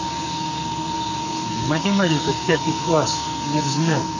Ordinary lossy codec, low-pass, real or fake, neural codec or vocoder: none; 7.2 kHz; fake; codec, 44.1 kHz, 2.6 kbps, SNAC